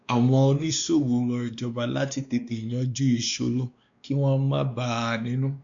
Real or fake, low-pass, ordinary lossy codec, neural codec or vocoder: fake; 7.2 kHz; MP3, 64 kbps; codec, 16 kHz, 2 kbps, X-Codec, WavLM features, trained on Multilingual LibriSpeech